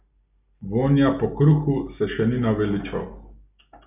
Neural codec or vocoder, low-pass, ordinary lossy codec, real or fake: none; 3.6 kHz; none; real